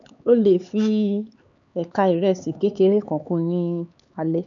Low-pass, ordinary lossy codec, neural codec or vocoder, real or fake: 7.2 kHz; none; codec, 16 kHz, 4 kbps, X-Codec, HuBERT features, trained on LibriSpeech; fake